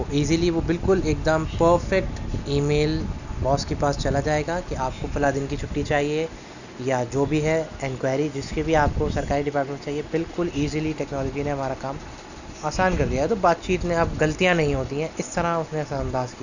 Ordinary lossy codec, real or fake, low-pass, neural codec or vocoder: none; real; 7.2 kHz; none